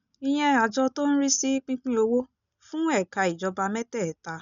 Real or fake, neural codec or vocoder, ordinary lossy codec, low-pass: real; none; none; 7.2 kHz